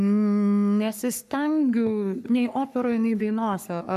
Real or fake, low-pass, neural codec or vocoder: fake; 14.4 kHz; codec, 44.1 kHz, 3.4 kbps, Pupu-Codec